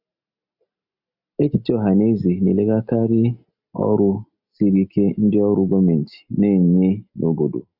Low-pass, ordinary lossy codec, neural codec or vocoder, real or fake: 5.4 kHz; none; none; real